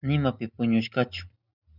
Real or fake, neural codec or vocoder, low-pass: real; none; 5.4 kHz